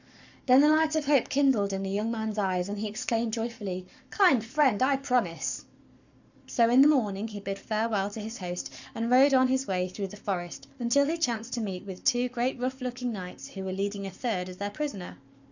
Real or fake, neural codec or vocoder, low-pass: fake; codec, 44.1 kHz, 7.8 kbps, Pupu-Codec; 7.2 kHz